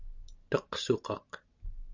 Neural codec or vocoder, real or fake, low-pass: none; real; 7.2 kHz